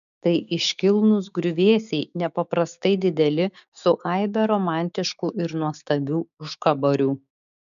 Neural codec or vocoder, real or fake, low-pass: codec, 16 kHz, 6 kbps, DAC; fake; 7.2 kHz